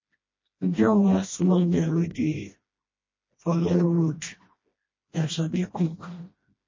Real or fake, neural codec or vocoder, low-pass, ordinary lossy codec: fake; codec, 16 kHz, 1 kbps, FreqCodec, smaller model; 7.2 kHz; MP3, 32 kbps